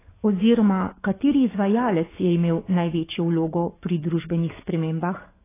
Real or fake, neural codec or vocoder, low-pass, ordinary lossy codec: fake; codec, 44.1 kHz, 7.8 kbps, DAC; 3.6 kHz; AAC, 16 kbps